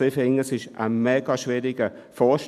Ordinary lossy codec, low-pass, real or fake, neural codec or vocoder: none; 14.4 kHz; real; none